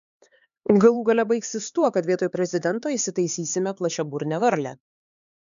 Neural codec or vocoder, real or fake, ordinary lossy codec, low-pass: codec, 16 kHz, 4 kbps, X-Codec, HuBERT features, trained on LibriSpeech; fake; MP3, 96 kbps; 7.2 kHz